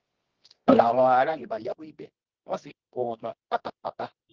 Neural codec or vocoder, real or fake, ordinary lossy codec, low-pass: codec, 24 kHz, 0.9 kbps, WavTokenizer, medium music audio release; fake; Opus, 16 kbps; 7.2 kHz